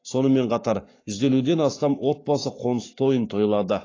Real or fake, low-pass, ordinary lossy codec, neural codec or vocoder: fake; 7.2 kHz; AAC, 32 kbps; codec, 44.1 kHz, 7.8 kbps, Pupu-Codec